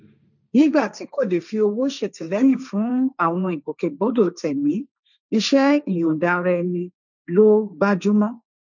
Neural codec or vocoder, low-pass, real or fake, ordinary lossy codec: codec, 16 kHz, 1.1 kbps, Voila-Tokenizer; 7.2 kHz; fake; none